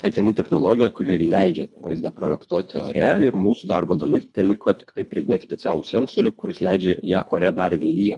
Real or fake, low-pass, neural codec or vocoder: fake; 10.8 kHz; codec, 24 kHz, 1.5 kbps, HILCodec